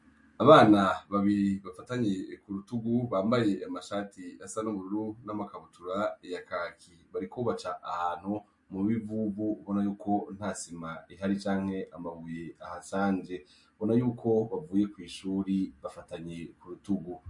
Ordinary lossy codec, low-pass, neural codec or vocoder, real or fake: MP3, 48 kbps; 10.8 kHz; none; real